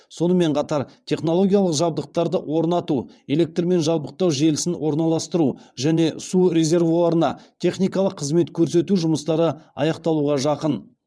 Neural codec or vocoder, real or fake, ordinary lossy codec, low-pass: vocoder, 22.05 kHz, 80 mel bands, WaveNeXt; fake; none; none